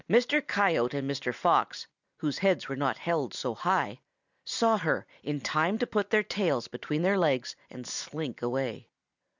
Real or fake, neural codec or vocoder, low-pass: real; none; 7.2 kHz